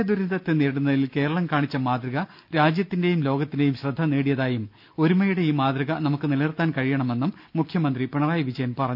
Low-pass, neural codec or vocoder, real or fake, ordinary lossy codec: 5.4 kHz; none; real; none